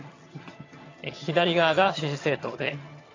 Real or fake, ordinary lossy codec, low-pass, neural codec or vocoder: fake; AAC, 32 kbps; 7.2 kHz; vocoder, 22.05 kHz, 80 mel bands, HiFi-GAN